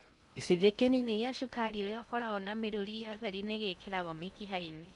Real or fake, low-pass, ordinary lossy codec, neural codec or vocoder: fake; 10.8 kHz; none; codec, 16 kHz in and 24 kHz out, 0.6 kbps, FocalCodec, streaming, 2048 codes